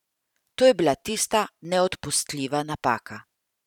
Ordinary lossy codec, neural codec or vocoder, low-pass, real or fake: none; vocoder, 44.1 kHz, 128 mel bands every 512 samples, BigVGAN v2; 19.8 kHz; fake